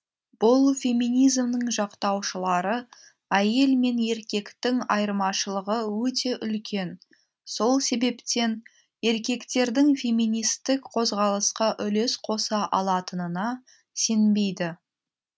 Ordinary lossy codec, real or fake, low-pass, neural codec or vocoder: none; real; none; none